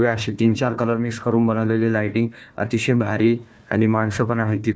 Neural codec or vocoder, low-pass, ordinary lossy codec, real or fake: codec, 16 kHz, 1 kbps, FunCodec, trained on Chinese and English, 50 frames a second; none; none; fake